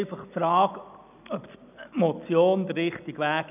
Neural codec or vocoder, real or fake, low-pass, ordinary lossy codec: none; real; 3.6 kHz; none